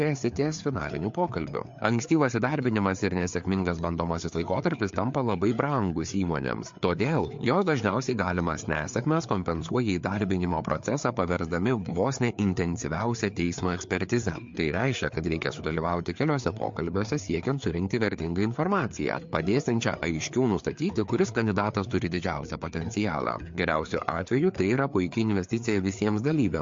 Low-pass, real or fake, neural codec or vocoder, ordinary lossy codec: 7.2 kHz; fake; codec, 16 kHz, 4 kbps, FreqCodec, larger model; MP3, 48 kbps